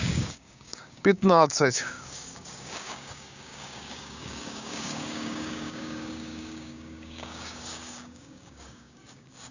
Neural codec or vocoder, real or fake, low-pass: codec, 16 kHz, 6 kbps, DAC; fake; 7.2 kHz